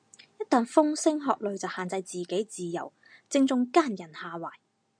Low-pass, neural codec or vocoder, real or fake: 9.9 kHz; none; real